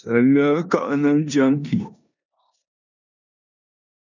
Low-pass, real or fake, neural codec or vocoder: 7.2 kHz; fake; codec, 16 kHz in and 24 kHz out, 0.9 kbps, LongCat-Audio-Codec, four codebook decoder